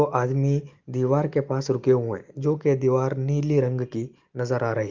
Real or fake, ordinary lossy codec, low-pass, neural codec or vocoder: real; Opus, 32 kbps; 7.2 kHz; none